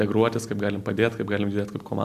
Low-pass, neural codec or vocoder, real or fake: 14.4 kHz; none; real